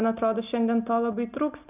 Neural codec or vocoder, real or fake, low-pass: none; real; 3.6 kHz